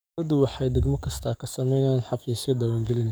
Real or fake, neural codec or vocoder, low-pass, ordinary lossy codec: fake; codec, 44.1 kHz, 7.8 kbps, DAC; none; none